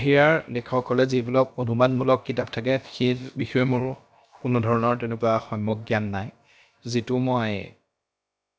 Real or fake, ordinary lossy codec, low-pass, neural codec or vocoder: fake; none; none; codec, 16 kHz, about 1 kbps, DyCAST, with the encoder's durations